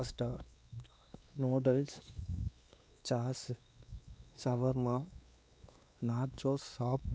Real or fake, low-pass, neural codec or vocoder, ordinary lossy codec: fake; none; codec, 16 kHz, 2 kbps, X-Codec, WavLM features, trained on Multilingual LibriSpeech; none